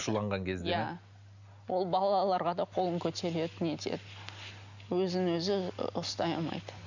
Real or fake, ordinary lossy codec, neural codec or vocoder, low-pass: real; none; none; 7.2 kHz